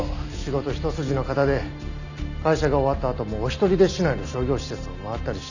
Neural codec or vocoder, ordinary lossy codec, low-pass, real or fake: none; none; 7.2 kHz; real